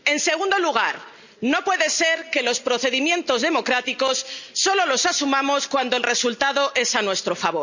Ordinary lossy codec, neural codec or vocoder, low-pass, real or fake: none; none; 7.2 kHz; real